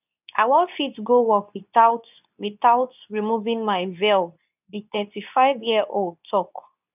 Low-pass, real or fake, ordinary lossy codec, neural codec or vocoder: 3.6 kHz; fake; none; codec, 24 kHz, 0.9 kbps, WavTokenizer, medium speech release version 2